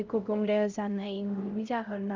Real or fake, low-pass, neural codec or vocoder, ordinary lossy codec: fake; 7.2 kHz; codec, 16 kHz, 0.5 kbps, X-Codec, HuBERT features, trained on LibriSpeech; Opus, 24 kbps